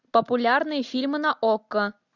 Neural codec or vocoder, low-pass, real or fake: none; 7.2 kHz; real